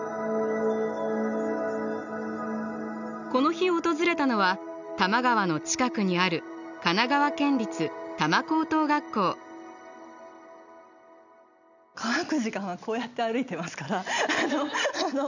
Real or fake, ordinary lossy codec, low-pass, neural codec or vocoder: real; none; 7.2 kHz; none